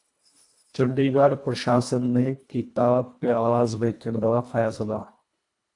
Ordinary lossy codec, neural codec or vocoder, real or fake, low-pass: AAC, 64 kbps; codec, 24 kHz, 1.5 kbps, HILCodec; fake; 10.8 kHz